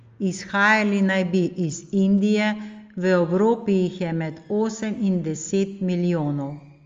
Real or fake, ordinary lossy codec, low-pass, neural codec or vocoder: real; Opus, 32 kbps; 7.2 kHz; none